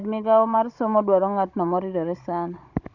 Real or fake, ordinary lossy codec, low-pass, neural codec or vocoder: fake; Opus, 64 kbps; 7.2 kHz; codec, 16 kHz, 16 kbps, FunCodec, trained on Chinese and English, 50 frames a second